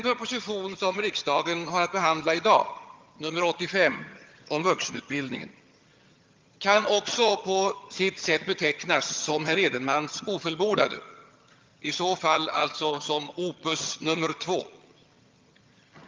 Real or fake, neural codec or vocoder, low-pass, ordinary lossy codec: fake; vocoder, 22.05 kHz, 80 mel bands, HiFi-GAN; 7.2 kHz; Opus, 24 kbps